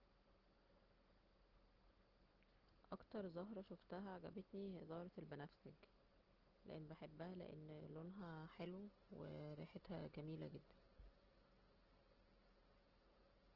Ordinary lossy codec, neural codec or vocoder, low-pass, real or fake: Opus, 16 kbps; none; 5.4 kHz; real